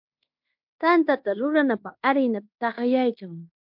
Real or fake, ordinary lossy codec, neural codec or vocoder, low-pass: fake; MP3, 48 kbps; codec, 16 kHz in and 24 kHz out, 0.9 kbps, LongCat-Audio-Codec, fine tuned four codebook decoder; 5.4 kHz